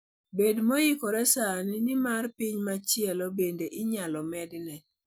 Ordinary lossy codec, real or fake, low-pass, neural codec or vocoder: none; real; none; none